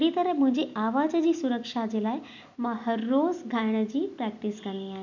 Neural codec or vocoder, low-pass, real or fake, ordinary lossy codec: none; 7.2 kHz; real; none